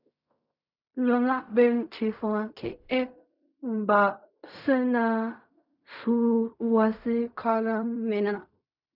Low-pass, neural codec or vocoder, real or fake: 5.4 kHz; codec, 16 kHz in and 24 kHz out, 0.4 kbps, LongCat-Audio-Codec, fine tuned four codebook decoder; fake